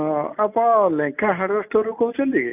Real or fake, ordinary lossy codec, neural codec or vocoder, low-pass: real; none; none; 3.6 kHz